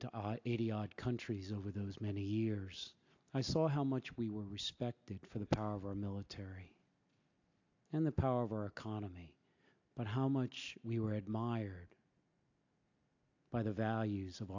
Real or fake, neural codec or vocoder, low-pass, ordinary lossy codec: real; none; 7.2 kHz; AAC, 48 kbps